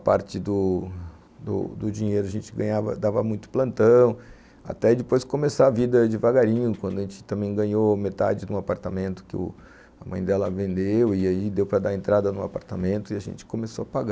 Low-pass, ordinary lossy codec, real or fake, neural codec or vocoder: none; none; real; none